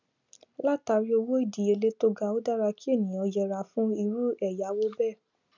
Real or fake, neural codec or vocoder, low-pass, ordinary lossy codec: real; none; 7.2 kHz; none